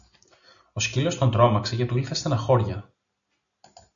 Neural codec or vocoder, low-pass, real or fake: none; 7.2 kHz; real